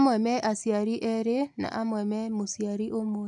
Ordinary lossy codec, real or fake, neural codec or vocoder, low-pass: MP3, 64 kbps; real; none; 10.8 kHz